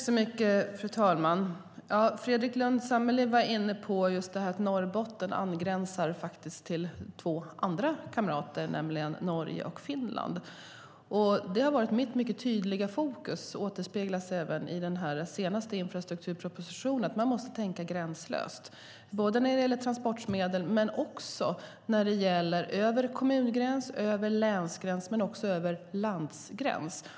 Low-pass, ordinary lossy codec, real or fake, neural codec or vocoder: none; none; real; none